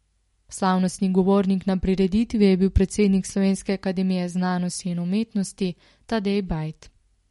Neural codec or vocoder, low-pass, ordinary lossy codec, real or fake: none; 19.8 kHz; MP3, 48 kbps; real